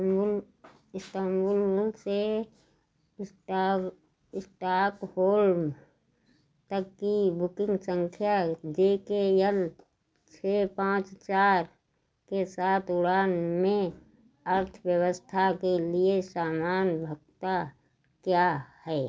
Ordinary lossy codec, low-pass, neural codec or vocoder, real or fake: none; none; none; real